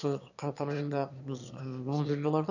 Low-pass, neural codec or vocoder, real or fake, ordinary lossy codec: 7.2 kHz; autoencoder, 22.05 kHz, a latent of 192 numbers a frame, VITS, trained on one speaker; fake; none